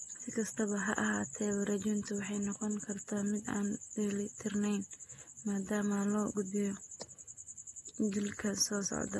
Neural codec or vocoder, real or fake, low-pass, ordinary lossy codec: none; real; 19.8 kHz; AAC, 32 kbps